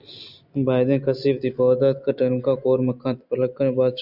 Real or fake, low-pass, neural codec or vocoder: real; 5.4 kHz; none